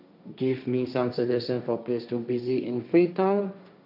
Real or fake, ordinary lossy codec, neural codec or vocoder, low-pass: fake; none; codec, 16 kHz, 1.1 kbps, Voila-Tokenizer; 5.4 kHz